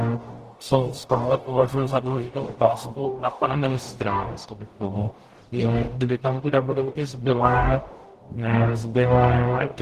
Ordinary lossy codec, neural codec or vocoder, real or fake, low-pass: Opus, 16 kbps; codec, 44.1 kHz, 0.9 kbps, DAC; fake; 14.4 kHz